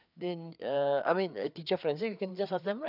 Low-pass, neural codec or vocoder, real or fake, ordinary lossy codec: 5.4 kHz; codec, 16 kHz, 4 kbps, FreqCodec, larger model; fake; none